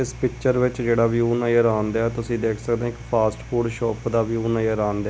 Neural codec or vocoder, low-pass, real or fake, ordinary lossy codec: none; none; real; none